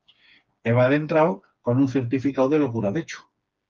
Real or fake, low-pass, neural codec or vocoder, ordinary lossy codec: fake; 7.2 kHz; codec, 16 kHz, 4 kbps, FreqCodec, smaller model; Opus, 24 kbps